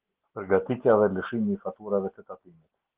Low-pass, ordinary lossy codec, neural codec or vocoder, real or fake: 3.6 kHz; Opus, 16 kbps; none; real